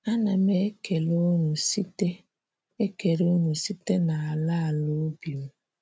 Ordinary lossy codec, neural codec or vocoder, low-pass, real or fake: none; none; none; real